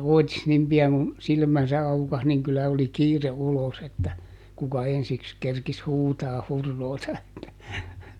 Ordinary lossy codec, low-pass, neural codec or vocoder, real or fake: none; 19.8 kHz; none; real